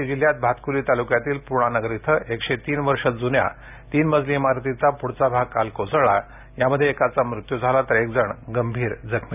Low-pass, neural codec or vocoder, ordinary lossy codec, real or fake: 3.6 kHz; none; none; real